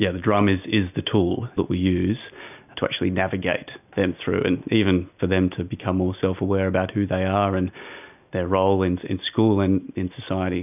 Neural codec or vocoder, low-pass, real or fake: none; 3.6 kHz; real